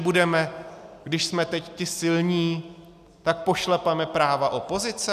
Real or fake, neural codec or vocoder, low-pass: real; none; 14.4 kHz